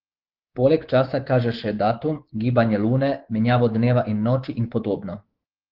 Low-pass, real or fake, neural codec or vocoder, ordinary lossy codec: 5.4 kHz; fake; codec, 24 kHz, 3.1 kbps, DualCodec; Opus, 16 kbps